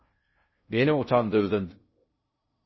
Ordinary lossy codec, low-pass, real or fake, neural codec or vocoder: MP3, 24 kbps; 7.2 kHz; fake; codec, 16 kHz in and 24 kHz out, 0.6 kbps, FocalCodec, streaming, 2048 codes